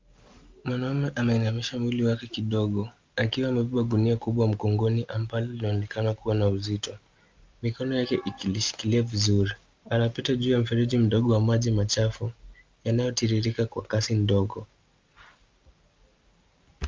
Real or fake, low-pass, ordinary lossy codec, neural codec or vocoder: real; 7.2 kHz; Opus, 24 kbps; none